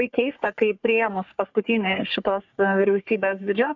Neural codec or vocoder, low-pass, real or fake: codec, 44.1 kHz, 3.4 kbps, Pupu-Codec; 7.2 kHz; fake